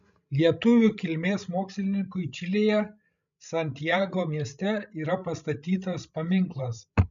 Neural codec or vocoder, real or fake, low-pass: codec, 16 kHz, 16 kbps, FreqCodec, larger model; fake; 7.2 kHz